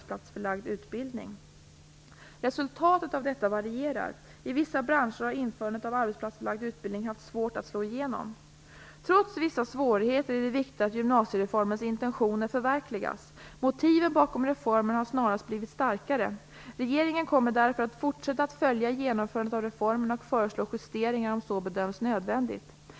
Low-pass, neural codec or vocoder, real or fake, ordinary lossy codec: none; none; real; none